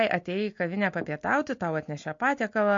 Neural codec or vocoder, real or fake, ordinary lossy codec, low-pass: none; real; MP3, 48 kbps; 7.2 kHz